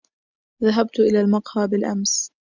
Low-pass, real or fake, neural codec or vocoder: 7.2 kHz; real; none